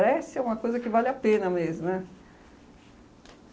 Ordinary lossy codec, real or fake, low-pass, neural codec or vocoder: none; real; none; none